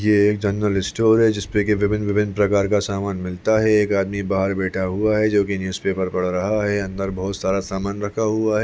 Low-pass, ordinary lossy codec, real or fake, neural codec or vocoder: none; none; real; none